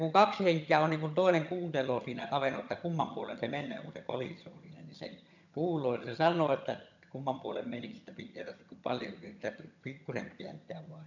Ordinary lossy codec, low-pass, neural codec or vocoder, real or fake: none; 7.2 kHz; vocoder, 22.05 kHz, 80 mel bands, HiFi-GAN; fake